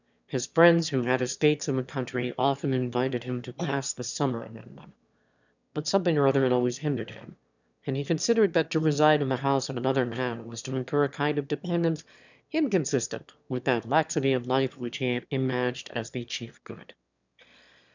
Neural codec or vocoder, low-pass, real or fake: autoencoder, 22.05 kHz, a latent of 192 numbers a frame, VITS, trained on one speaker; 7.2 kHz; fake